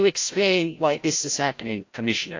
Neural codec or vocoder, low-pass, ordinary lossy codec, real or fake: codec, 16 kHz, 0.5 kbps, FreqCodec, larger model; 7.2 kHz; AAC, 48 kbps; fake